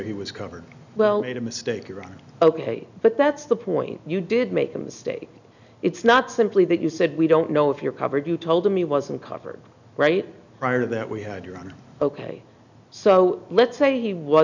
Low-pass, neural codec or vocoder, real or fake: 7.2 kHz; none; real